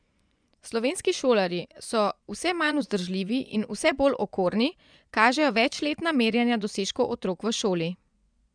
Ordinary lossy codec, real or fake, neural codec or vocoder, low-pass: none; fake; vocoder, 24 kHz, 100 mel bands, Vocos; 9.9 kHz